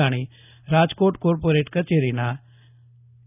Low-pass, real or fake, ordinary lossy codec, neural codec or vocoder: 3.6 kHz; real; none; none